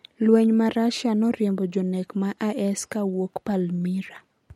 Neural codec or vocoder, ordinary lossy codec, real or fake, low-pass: none; MP3, 64 kbps; real; 19.8 kHz